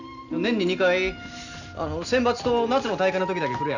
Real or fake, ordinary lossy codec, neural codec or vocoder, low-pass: real; none; none; 7.2 kHz